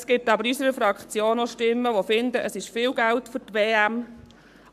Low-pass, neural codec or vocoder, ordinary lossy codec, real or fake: 14.4 kHz; codec, 44.1 kHz, 7.8 kbps, Pupu-Codec; AAC, 96 kbps; fake